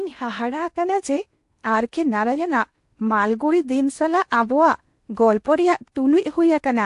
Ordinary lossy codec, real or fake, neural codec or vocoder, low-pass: AAC, 64 kbps; fake; codec, 16 kHz in and 24 kHz out, 0.8 kbps, FocalCodec, streaming, 65536 codes; 10.8 kHz